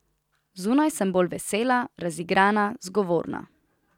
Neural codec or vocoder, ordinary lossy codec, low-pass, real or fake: none; none; 19.8 kHz; real